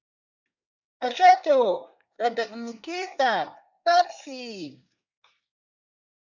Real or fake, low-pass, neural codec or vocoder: fake; 7.2 kHz; codec, 24 kHz, 1 kbps, SNAC